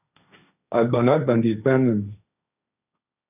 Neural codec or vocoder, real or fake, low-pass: codec, 16 kHz, 1.1 kbps, Voila-Tokenizer; fake; 3.6 kHz